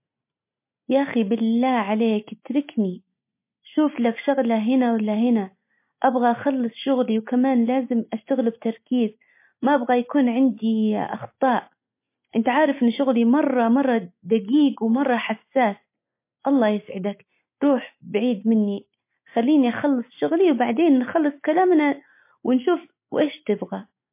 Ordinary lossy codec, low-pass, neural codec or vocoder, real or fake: MP3, 24 kbps; 3.6 kHz; none; real